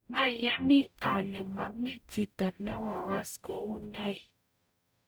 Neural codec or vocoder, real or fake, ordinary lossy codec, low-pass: codec, 44.1 kHz, 0.9 kbps, DAC; fake; none; none